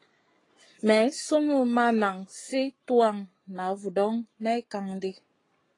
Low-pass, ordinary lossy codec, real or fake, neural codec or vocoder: 10.8 kHz; AAC, 32 kbps; fake; codec, 44.1 kHz, 7.8 kbps, Pupu-Codec